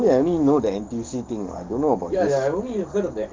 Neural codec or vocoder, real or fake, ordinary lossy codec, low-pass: codec, 44.1 kHz, 7.8 kbps, DAC; fake; Opus, 24 kbps; 7.2 kHz